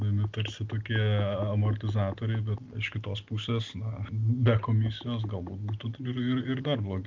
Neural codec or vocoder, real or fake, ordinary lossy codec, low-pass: none; real; Opus, 24 kbps; 7.2 kHz